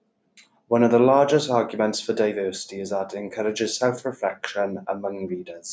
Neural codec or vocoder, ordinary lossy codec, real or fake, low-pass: none; none; real; none